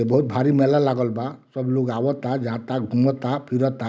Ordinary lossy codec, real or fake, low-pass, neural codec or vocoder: none; real; none; none